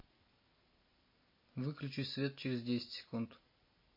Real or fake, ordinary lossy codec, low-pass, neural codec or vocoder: real; MP3, 24 kbps; 5.4 kHz; none